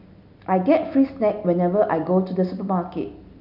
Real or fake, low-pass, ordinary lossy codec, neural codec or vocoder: real; 5.4 kHz; none; none